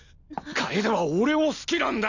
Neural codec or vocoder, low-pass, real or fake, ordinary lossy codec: none; 7.2 kHz; real; none